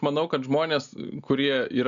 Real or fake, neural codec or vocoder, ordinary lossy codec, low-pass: real; none; MP3, 96 kbps; 7.2 kHz